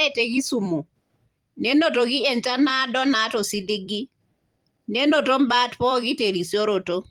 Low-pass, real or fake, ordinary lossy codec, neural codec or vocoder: 19.8 kHz; fake; Opus, 24 kbps; vocoder, 44.1 kHz, 128 mel bands every 256 samples, BigVGAN v2